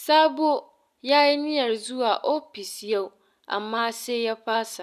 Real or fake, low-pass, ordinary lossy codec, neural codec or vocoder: real; 14.4 kHz; none; none